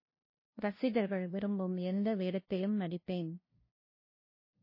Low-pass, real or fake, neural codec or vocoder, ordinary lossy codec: 5.4 kHz; fake; codec, 16 kHz, 0.5 kbps, FunCodec, trained on LibriTTS, 25 frames a second; MP3, 24 kbps